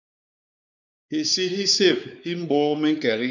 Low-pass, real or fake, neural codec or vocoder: 7.2 kHz; fake; codec, 16 kHz, 4 kbps, X-Codec, WavLM features, trained on Multilingual LibriSpeech